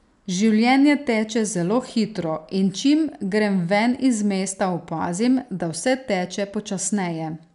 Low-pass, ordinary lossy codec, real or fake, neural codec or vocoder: 10.8 kHz; none; real; none